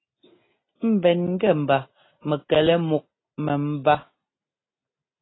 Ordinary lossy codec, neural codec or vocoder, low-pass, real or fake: AAC, 16 kbps; none; 7.2 kHz; real